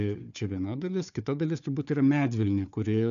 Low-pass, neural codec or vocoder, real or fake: 7.2 kHz; codec, 16 kHz, 4 kbps, FunCodec, trained on Chinese and English, 50 frames a second; fake